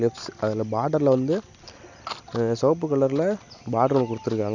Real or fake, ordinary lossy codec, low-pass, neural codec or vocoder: real; none; 7.2 kHz; none